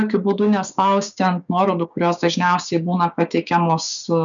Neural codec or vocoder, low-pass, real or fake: codec, 16 kHz, 6 kbps, DAC; 7.2 kHz; fake